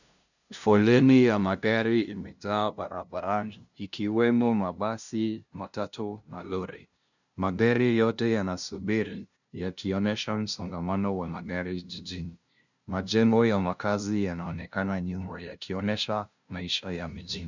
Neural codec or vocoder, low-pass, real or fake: codec, 16 kHz, 0.5 kbps, FunCodec, trained on LibriTTS, 25 frames a second; 7.2 kHz; fake